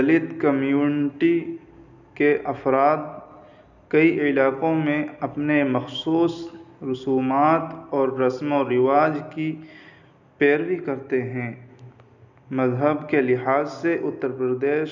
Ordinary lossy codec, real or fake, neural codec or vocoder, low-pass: none; real; none; 7.2 kHz